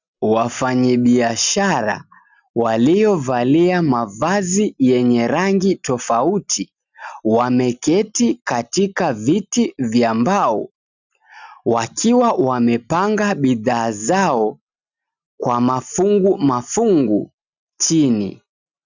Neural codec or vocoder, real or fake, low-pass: none; real; 7.2 kHz